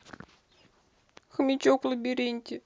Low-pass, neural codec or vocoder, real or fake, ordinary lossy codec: none; none; real; none